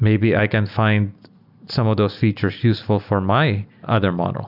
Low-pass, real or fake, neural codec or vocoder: 5.4 kHz; real; none